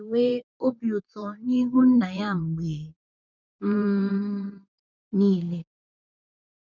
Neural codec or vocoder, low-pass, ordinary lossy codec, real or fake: vocoder, 44.1 kHz, 128 mel bands, Pupu-Vocoder; 7.2 kHz; none; fake